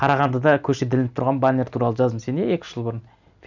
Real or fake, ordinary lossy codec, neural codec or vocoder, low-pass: real; none; none; 7.2 kHz